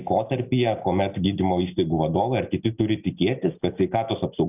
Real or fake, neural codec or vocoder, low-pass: real; none; 3.6 kHz